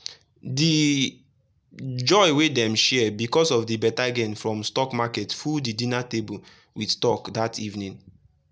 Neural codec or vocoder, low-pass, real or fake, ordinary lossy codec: none; none; real; none